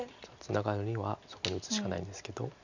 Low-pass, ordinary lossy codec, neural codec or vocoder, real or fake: 7.2 kHz; none; none; real